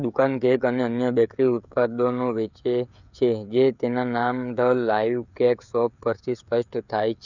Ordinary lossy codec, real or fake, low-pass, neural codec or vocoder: none; fake; 7.2 kHz; codec, 16 kHz, 16 kbps, FreqCodec, smaller model